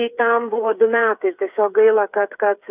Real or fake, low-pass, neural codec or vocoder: fake; 3.6 kHz; codec, 16 kHz, 8 kbps, FreqCodec, smaller model